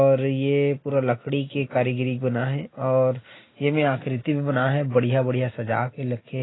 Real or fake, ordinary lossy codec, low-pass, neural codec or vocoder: real; AAC, 16 kbps; 7.2 kHz; none